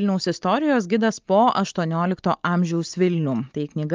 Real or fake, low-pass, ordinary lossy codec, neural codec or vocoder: real; 7.2 kHz; Opus, 32 kbps; none